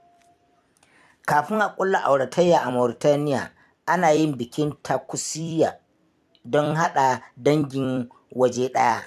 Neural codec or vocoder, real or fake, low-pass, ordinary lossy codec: vocoder, 44.1 kHz, 128 mel bands every 256 samples, BigVGAN v2; fake; 14.4 kHz; AAC, 96 kbps